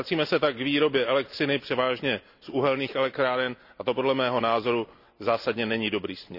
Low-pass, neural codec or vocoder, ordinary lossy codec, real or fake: 5.4 kHz; none; none; real